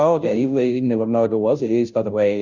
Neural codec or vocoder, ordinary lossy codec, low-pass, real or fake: codec, 16 kHz, 0.5 kbps, FunCodec, trained on Chinese and English, 25 frames a second; Opus, 64 kbps; 7.2 kHz; fake